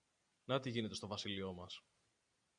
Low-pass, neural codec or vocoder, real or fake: 9.9 kHz; none; real